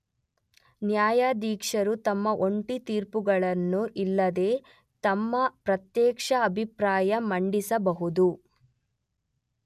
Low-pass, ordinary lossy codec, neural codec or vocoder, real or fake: 14.4 kHz; none; none; real